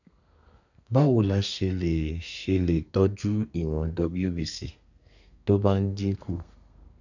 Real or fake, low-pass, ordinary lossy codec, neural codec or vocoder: fake; 7.2 kHz; none; codec, 32 kHz, 1.9 kbps, SNAC